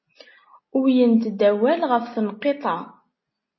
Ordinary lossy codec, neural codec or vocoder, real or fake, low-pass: MP3, 24 kbps; none; real; 7.2 kHz